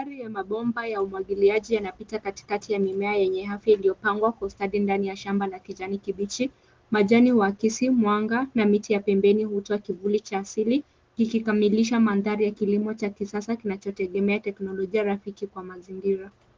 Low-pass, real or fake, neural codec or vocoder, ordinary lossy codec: 7.2 kHz; real; none; Opus, 16 kbps